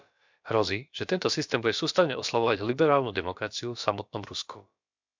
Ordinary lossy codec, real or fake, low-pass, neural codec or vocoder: MP3, 64 kbps; fake; 7.2 kHz; codec, 16 kHz, about 1 kbps, DyCAST, with the encoder's durations